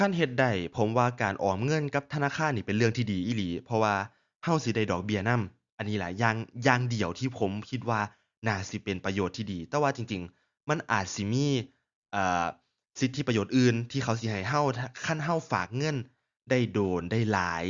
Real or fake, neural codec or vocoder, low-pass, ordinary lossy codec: real; none; 7.2 kHz; Opus, 64 kbps